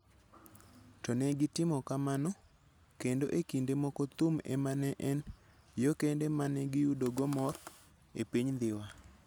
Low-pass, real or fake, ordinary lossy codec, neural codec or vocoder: none; real; none; none